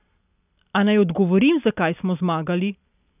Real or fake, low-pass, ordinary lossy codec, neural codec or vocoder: real; 3.6 kHz; none; none